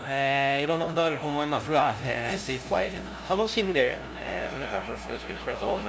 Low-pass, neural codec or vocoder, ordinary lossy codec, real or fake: none; codec, 16 kHz, 0.5 kbps, FunCodec, trained on LibriTTS, 25 frames a second; none; fake